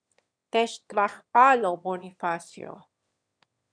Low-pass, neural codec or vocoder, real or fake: 9.9 kHz; autoencoder, 22.05 kHz, a latent of 192 numbers a frame, VITS, trained on one speaker; fake